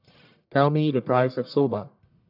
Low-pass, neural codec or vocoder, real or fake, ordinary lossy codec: 5.4 kHz; codec, 44.1 kHz, 1.7 kbps, Pupu-Codec; fake; none